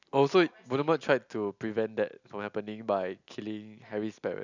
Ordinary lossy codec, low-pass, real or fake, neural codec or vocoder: none; 7.2 kHz; real; none